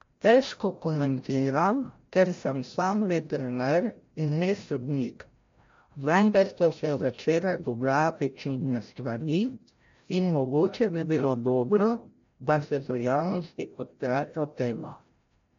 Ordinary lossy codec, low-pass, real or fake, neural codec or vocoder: MP3, 48 kbps; 7.2 kHz; fake; codec, 16 kHz, 0.5 kbps, FreqCodec, larger model